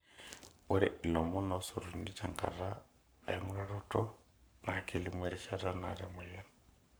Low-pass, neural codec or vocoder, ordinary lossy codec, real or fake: none; codec, 44.1 kHz, 7.8 kbps, Pupu-Codec; none; fake